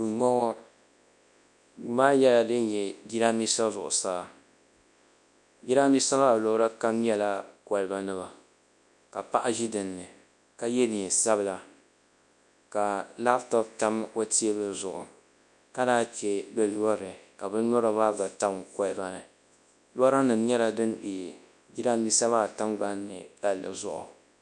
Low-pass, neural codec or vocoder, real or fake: 10.8 kHz; codec, 24 kHz, 0.9 kbps, WavTokenizer, large speech release; fake